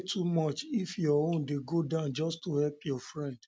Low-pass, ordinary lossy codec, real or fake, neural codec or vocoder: none; none; real; none